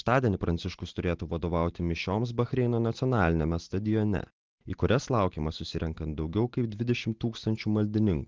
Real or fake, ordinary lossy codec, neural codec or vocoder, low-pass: real; Opus, 16 kbps; none; 7.2 kHz